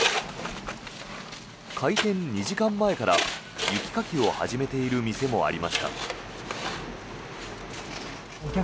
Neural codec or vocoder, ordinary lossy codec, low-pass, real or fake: none; none; none; real